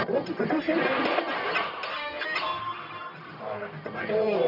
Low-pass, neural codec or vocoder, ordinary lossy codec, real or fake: 5.4 kHz; codec, 44.1 kHz, 1.7 kbps, Pupu-Codec; none; fake